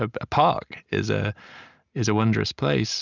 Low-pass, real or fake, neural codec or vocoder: 7.2 kHz; real; none